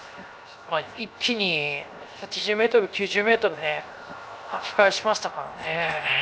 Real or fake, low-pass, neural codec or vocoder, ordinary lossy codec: fake; none; codec, 16 kHz, 0.7 kbps, FocalCodec; none